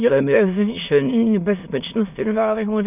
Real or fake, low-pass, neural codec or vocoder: fake; 3.6 kHz; autoencoder, 22.05 kHz, a latent of 192 numbers a frame, VITS, trained on many speakers